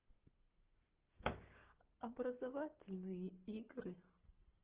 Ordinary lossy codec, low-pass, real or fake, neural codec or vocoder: Opus, 16 kbps; 3.6 kHz; fake; codec, 44.1 kHz, 7.8 kbps, Pupu-Codec